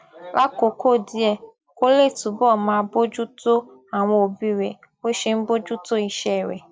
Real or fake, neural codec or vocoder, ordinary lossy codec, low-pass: real; none; none; none